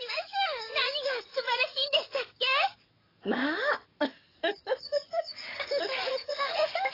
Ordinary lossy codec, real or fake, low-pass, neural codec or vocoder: AAC, 24 kbps; fake; 5.4 kHz; codec, 44.1 kHz, 7.8 kbps, DAC